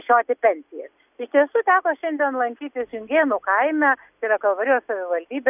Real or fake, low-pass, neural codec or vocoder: real; 3.6 kHz; none